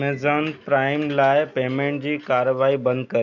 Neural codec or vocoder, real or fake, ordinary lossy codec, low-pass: none; real; none; 7.2 kHz